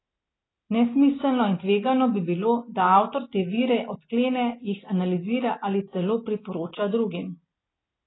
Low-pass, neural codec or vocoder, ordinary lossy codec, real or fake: 7.2 kHz; none; AAC, 16 kbps; real